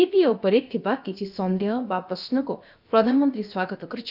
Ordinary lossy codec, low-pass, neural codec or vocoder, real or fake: none; 5.4 kHz; codec, 16 kHz, about 1 kbps, DyCAST, with the encoder's durations; fake